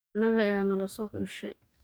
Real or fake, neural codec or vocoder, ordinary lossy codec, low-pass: fake; codec, 44.1 kHz, 2.6 kbps, DAC; none; none